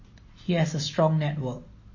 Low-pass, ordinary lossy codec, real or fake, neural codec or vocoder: 7.2 kHz; MP3, 32 kbps; real; none